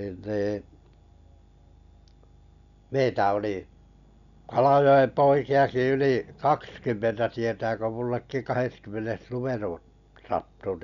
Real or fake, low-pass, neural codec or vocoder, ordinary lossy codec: real; 7.2 kHz; none; none